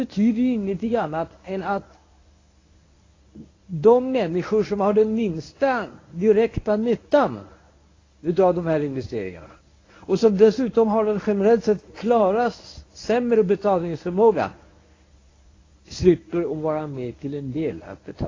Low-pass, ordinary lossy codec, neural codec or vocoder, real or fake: 7.2 kHz; AAC, 32 kbps; codec, 24 kHz, 0.9 kbps, WavTokenizer, medium speech release version 1; fake